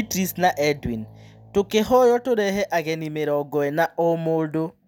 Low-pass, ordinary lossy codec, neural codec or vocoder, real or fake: 19.8 kHz; none; none; real